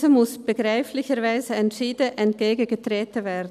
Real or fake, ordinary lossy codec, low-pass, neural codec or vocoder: real; none; 14.4 kHz; none